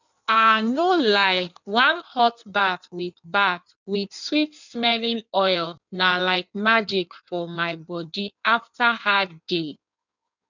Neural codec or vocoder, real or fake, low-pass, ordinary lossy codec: codec, 16 kHz in and 24 kHz out, 1.1 kbps, FireRedTTS-2 codec; fake; 7.2 kHz; none